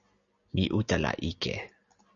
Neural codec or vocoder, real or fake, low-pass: none; real; 7.2 kHz